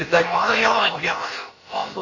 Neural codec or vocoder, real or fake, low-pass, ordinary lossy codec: codec, 16 kHz, about 1 kbps, DyCAST, with the encoder's durations; fake; 7.2 kHz; MP3, 32 kbps